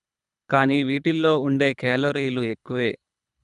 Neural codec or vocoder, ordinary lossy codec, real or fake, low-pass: codec, 24 kHz, 3 kbps, HILCodec; none; fake; 10.8 kHz